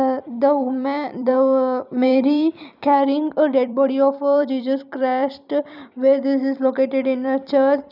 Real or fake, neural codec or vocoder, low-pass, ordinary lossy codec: fake; vocoder, 44.1 kHz, 128 mel bands every 256 samples, BigVGAN v2; 5.4 kHz; none